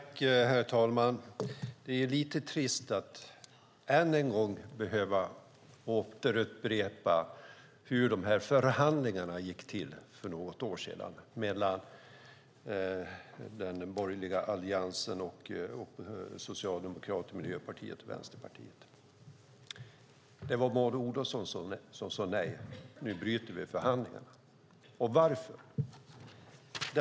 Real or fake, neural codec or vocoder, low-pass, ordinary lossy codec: real; none; none; none